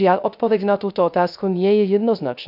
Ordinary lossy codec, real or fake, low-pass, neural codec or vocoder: none; fake; 5.4 kHz; codec, 16 kHz, 0.3 kbps, FocalCodec